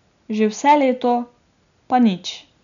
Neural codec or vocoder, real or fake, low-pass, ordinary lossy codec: none; real; 7.2 kHz; none